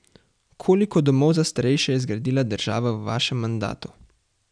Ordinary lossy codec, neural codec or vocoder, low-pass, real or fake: none; none; 9.9 kHz; real